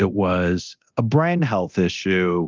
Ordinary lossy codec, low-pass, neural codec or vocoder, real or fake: Opus, 24 kbps; 7.2 kHz; codec, 24 kHz, 0.9 kbps, DualCodec; fake